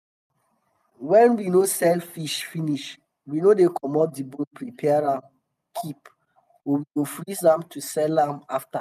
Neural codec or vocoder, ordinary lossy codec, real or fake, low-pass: none; none; real; 14.4 kHz